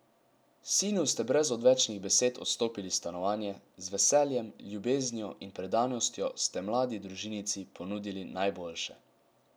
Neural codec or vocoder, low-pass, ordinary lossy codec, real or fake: none; none; none; real